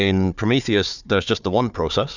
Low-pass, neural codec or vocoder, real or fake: 7.2 kHz; codec, 16 kHz, 4 kbps, FunCodec, trained on Chinese and English, 50 frames a second; fake